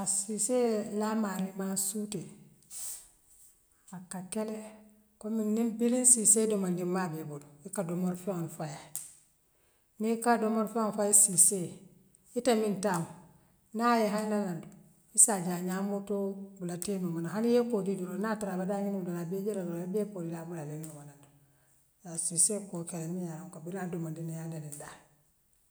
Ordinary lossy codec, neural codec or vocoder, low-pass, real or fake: none; none; none; real